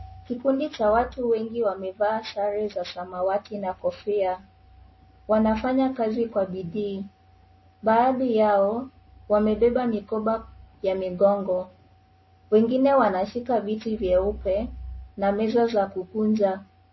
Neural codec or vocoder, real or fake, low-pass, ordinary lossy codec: none; real; 7.2 kHz; MP3, 24 kbps